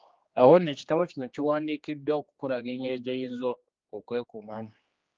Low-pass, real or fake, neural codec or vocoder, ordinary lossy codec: 7.2 kHz; fake; codec, 16 kHz, 2 kbps, X-Codec, HuBERT features, trained on general audio; Opus, 32 kbps